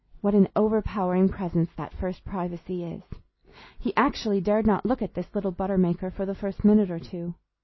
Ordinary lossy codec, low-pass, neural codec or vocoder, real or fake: MP3, 24 kbps; 7.2 kHz; none; real